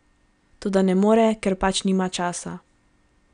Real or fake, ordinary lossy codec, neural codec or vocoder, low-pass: real; none; none; 9.9 kHz